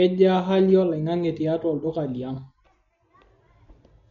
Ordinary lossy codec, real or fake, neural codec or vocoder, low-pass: MP3, 48 kbps; real; none; 7.2 kHz